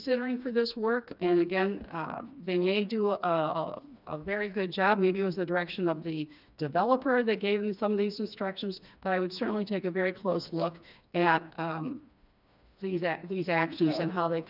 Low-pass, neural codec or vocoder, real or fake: 5.4 kHz; codec, 16 kHz, 2 kbps, FreqCodec, smaller model; fake